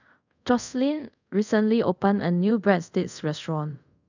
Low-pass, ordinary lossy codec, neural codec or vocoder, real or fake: 7.2 kHz; none; codec, 24 kHz, 0.5 kbps, DualCodec; fake